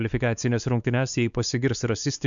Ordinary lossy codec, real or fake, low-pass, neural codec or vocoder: MP3, 96 kbps; fake; 7.2 kHz; codec, 16 kHz, 4 kbps, X-Codec, WavLM features, trained on Multilingual LibriSpeech